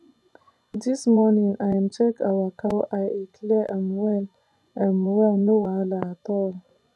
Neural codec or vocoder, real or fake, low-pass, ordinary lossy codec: vocoder, 24 kHz, 100 mel bands, Vocos; fake; none; none